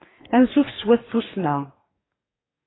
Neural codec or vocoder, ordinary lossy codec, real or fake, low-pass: codec, 44.1 kHz, 2.6 kbps, DAC; AAC, 16 kbps; fake; 7.2 kHz